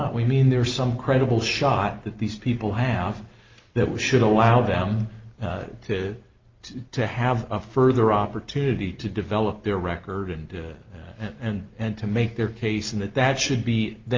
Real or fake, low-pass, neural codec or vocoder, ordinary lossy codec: real; 7.2 kHz; none; Opus, 24 kbps